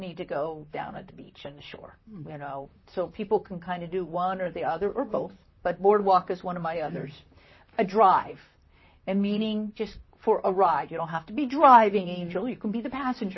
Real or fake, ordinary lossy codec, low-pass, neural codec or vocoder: fake; MP3, 24 kbps; 7.2 kHz; vocoder, 44.1 kHz, 128 mel bands, Pupu-Vocoder